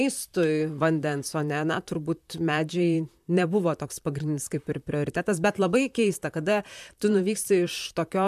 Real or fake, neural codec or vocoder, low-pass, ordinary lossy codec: fake; vocoder, 44.1 kHz, 128 mel bands, Pupu-Vocoder; 14.4 kHz; MP3, 96 kbps